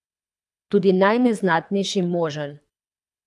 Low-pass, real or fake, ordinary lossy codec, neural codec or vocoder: none; fake; none; codec, 24 kHz, 3 kbps, HILCodec